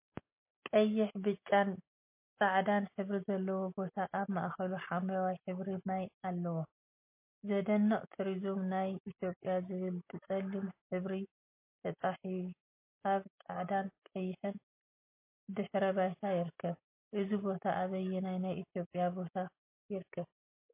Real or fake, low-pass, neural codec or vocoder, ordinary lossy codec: real; 3.6 kHz; none; MP3, 24 kbps